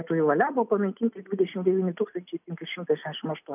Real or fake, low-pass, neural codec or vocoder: real; 3.6 kHz; none